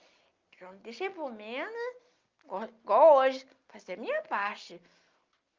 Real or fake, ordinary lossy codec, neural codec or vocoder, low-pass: real; Opus, 24 kbps; none; 7.2 kHz